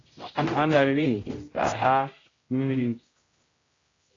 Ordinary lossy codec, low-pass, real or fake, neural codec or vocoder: AAC, 32 kbps; 7.2 kHz; fake; codec, 16 kHz, 0.5 kbps, X-Codec, HuBERT features, trained on general audio